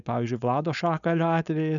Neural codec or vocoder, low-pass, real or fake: codec, 16 kHz, 4.8 kbps, FACodec; 7.2 kHz; fake